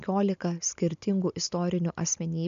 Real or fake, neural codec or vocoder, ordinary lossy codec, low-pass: real; none; MP3, 96 kbps; 7.2 kHz